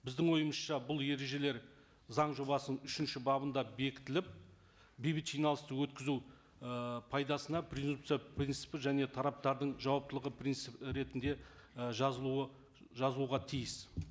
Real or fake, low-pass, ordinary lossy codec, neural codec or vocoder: real; none; none; none